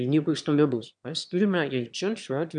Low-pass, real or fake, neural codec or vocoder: 9.9 kHz; fake; autoencoder, 22.05 kHz, a latent of 192 numbers a frame, VITS, trained on one speaker